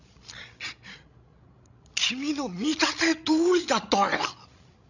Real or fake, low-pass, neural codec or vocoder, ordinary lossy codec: fake; 7.2 kHz; codec, 16 kHz, 16 kbps, FreqCodec, larger model; none